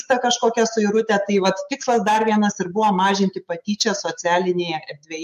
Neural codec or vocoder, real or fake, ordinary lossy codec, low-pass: autoencoder, 48 kHz, 128 numbers a frame, DAC-VAE, trained on Japanese speech; fake; MP3, 64 kbps; 14.4 kHz